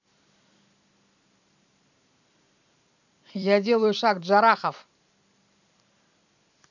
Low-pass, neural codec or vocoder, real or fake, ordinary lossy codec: 7.2 kHz; vocoder, 44.1 kHz, 128 mel bands every 256 samples, BigVGAN v2; fake; none